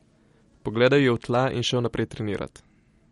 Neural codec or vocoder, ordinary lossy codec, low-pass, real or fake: none; MP3, 48 kbps; 19.8 kHz; real